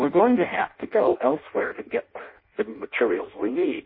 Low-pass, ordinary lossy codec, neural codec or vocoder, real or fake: 5.4 kHz; MP3, 24 kbps; codec, 16 kHz in and 24 kHz out, 0.6 kbps, FireRedTTS-2 codec; fake